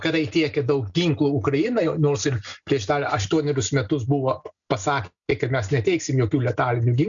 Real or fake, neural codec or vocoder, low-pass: real; none; 7.2 kHz